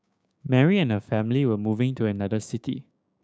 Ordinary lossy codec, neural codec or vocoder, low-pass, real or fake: none; codec, 16 kHz, 6 kbps, DAC; none; fake